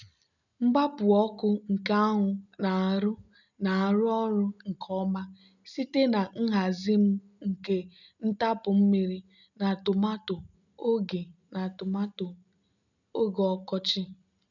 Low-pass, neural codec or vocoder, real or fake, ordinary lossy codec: 7.2 kHz; none; real; none